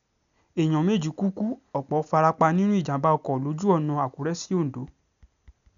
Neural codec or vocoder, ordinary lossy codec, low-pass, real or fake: none; MP3, 96 kbps; 7.2 kHz; real